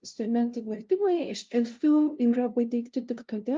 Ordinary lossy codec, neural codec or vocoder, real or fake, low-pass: Opus, 24 kbps; codec, 16 kHz, 0.5 kbps, FunCodec, trained on LibriTTS, 25 frames a second; fake; 7.2 kHz